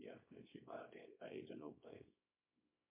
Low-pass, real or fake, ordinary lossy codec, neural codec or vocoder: 3.6 kHz; fake; MP3, 24 kbps; codec, 24 kHz, 0.9 kbps, WavTokenizer, small release